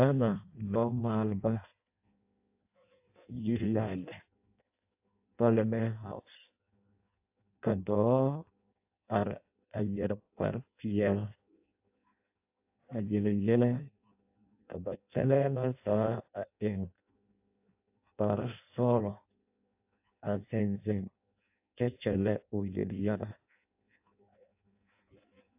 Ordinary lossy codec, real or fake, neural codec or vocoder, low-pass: AAC, 32 kbps; fake; codec, 16 kHz in and 24 kHz out, 0.6 kbps, FireRedTTS-2 codec; 3.6 kHz